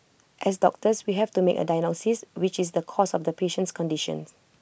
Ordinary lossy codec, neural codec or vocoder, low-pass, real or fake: none; none; none; real